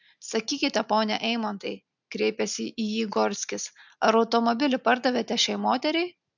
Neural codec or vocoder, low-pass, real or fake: none; 7.2 kHz; real